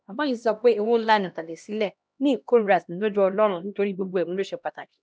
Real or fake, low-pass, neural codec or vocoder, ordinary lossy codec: fake; none; codec, 16 kHz, 1 kbps, X-Codec, HuBERT features, trained on LibriSpeech; none